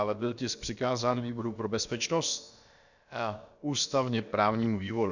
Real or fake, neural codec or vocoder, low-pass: fake; codec, 16 kHz, about 1 kbps, DyCAST, with the encoder's durations; 7.2 kHz